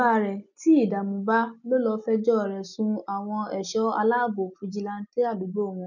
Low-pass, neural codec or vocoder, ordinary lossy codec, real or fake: 7.2 kHz; none; none; real